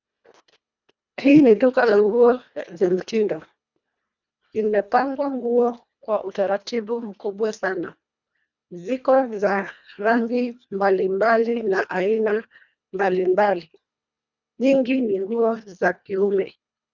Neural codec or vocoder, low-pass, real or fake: codec, 24 kHz, 1.5 kbps, HILCodec; 7.2 kHz; fake